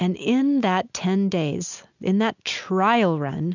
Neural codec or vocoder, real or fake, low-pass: none; real; 7.2 kHz